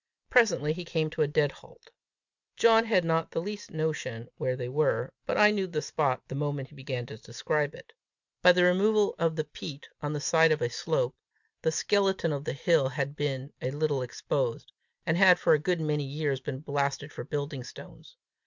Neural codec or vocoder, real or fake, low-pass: none; real; 7.2 kHz